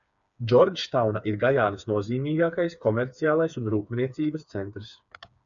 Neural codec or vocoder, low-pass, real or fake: codec, 16 kHz, 4 kbps, FreqCodec, smaller model; 7.2 kHz; fake